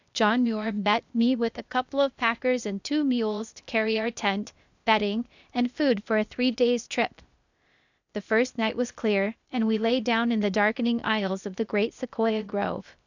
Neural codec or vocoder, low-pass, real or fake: codec, 16 kHz, 0.8 kbps, ZipCodec; 7.2 kHz; fake